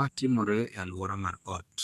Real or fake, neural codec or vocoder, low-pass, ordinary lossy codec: fake; codec, 32 kHz, 1.9 kbps, SNAC; 14.4 kHz; none